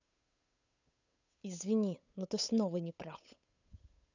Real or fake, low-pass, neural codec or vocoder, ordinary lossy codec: fake; 7.2 kHz; codec, 16 kHz, 8 kbps, FunCodec, trained on Chinese and English, 25 frames a second; none